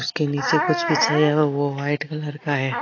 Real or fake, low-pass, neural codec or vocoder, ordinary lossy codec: real; 7.2 kHz; none; AAC, 32 kbps